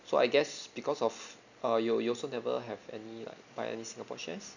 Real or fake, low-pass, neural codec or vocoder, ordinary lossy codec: real; 7.2 kHz; none; none